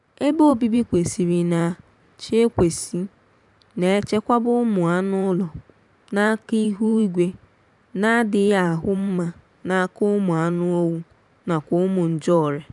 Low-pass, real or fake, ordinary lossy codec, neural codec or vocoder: 10.8 kHz; fake; none; vocoder, 44.1 kHz, 128 mel bands every 512 samples, BigVGAN v2